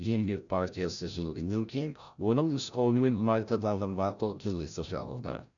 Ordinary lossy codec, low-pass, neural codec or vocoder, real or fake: none; 7.2 kHz; codec, 16 kHz, 0.5 kbps, FreqCodec, larger model; fake